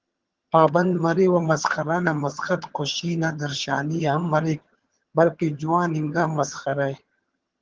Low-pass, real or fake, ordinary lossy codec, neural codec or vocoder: 7.2 kHz; fake; Opus, 16 kbps; vocoder, 22.05 kHz, 80 mel bands, HiFi-GAN